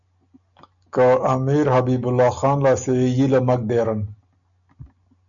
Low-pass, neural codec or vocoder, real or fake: 7.2 kHz; none; real